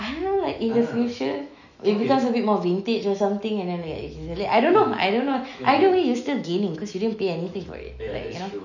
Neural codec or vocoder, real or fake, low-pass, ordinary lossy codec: autoencoder, 48 kHz, 128 numbers a frame, DAC-VAE, trained on Japanese speech; fake; 7.2 kHz; none